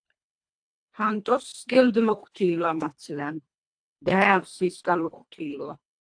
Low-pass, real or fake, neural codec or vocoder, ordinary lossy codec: 9.9 kHz; fake; codec, 24 kHz, 1.5 kbps, HILCodec; AAC, 64 kbps